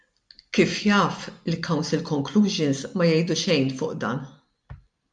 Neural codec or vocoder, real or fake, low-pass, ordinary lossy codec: none; real; 9.9 kHz; MP3, 96 kbps